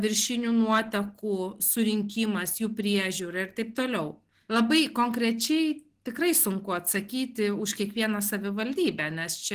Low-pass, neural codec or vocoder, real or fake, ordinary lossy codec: 14.4 kHz; none; real; Opus, 16 kbps